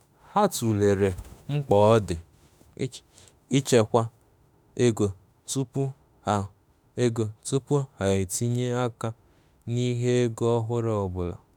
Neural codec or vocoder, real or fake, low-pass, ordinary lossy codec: autoencoder, 48 kHz, 32 numbers a frame, DAC-VAE, trained on Japanese speech; fake; none; none